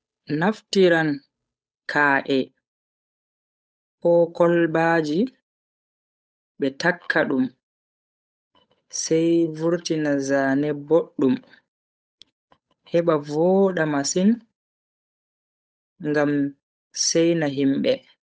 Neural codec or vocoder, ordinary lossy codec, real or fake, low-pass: codec, 16 kHz, 8 kbps, FunCodec, trained on Chinese and English, 25 frames a second; none; fake; none